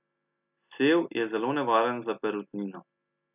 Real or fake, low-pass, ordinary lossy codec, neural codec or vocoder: real; 3.6 kHz; none; none